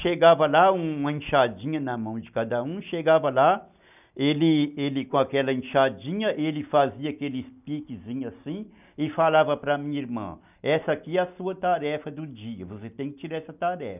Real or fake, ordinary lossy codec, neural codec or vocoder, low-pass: real; none; none; 3.6 kHz